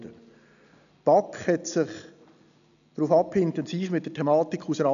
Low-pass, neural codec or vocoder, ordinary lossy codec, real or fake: 7.2 kHz; none; none; real